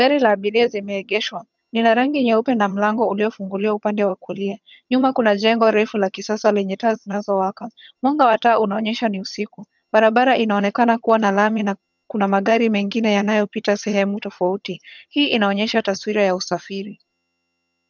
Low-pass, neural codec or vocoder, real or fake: 7.2 kHz; vocoder, 22.05 kHz, 80 mel bands, HiFi-GAN; fake